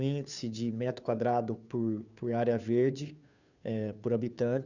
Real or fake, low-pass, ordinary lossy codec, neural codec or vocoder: fake; 7.2 kHz; none; codec, 16 kHz, 2 kbps, FunCodec, trained on Chinese and English, 25 frames a second